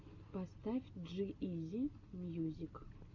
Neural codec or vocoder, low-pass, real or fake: none; 7.2 kHz; real